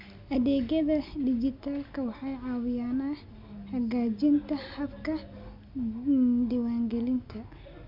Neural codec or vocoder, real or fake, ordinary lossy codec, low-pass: none; real; MP3, 48 kbps; 5.4 kHz